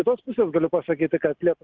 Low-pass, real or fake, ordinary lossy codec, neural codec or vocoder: 7.2 kHz; real; Opus, 24 kbps; none